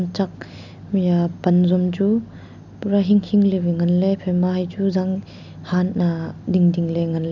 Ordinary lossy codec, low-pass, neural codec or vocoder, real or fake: none; 7.2 kHz; none; real